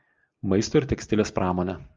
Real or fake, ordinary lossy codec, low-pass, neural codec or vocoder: real; Opus, 32 kbps; 7.2 kHz; none